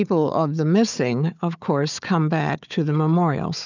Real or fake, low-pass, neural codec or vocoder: fake; 7.2 kHz; codec, 16 kHz, 4 kbps, FunCodec, trained on Chinese and English, 50 frames a second